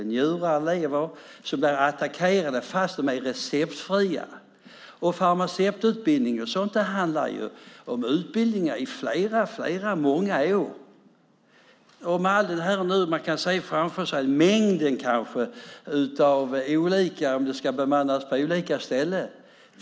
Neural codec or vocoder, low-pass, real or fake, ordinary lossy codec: none; none; real; none